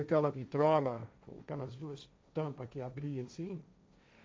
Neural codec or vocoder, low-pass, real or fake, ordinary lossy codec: codec, 16 kHz, 1.1 kbps, Voila-Tokenizer; none; fake; none